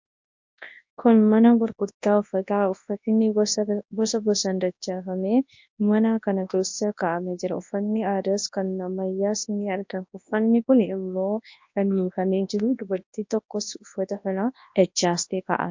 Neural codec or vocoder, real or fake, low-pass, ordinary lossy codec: codec, 24 kHz, 0.9 kbps, WavTokenizer, large speech release; fake; 7.2 kHz; MP3, 48 kbps